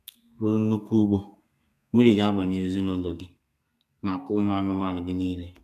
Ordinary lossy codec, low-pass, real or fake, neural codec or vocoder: none; 14.4 kHz; fake; codec, 32 kHz, 1.9 kbps, SNAC